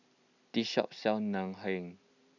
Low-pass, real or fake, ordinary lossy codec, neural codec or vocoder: 7.2 kHz; real; none; none